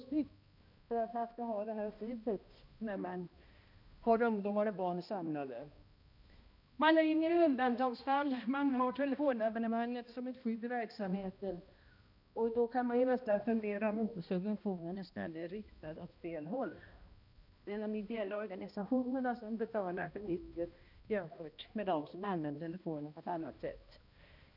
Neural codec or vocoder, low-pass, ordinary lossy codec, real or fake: codec, 16 kHz, 1 kbps, X-Codec, HuBERT features, trained on balanced general audio; 5.4 kHz; none; fake